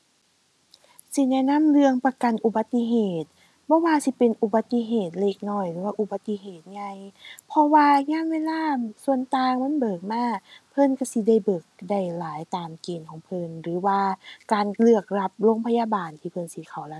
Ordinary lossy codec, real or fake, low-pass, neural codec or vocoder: none; real; none; none